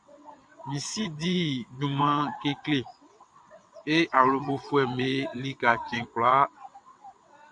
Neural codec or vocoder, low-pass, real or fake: vocoder, 22.05 kHz, 80 mel bands, WaveNeXt; 9.9 kHz; fake